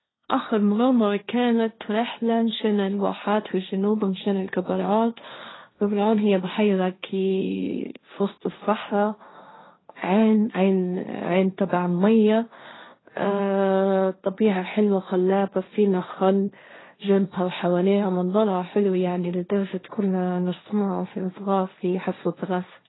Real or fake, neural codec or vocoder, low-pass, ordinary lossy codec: fake; codec, 16 kHz, 1.1 kbps, Voila-Tokenizer; 7.2 kHz; AAC, 16 kbps